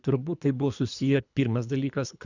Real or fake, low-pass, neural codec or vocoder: fake; 7.2 kHz; codec, 24 kHz, 3 kbps, HILCodec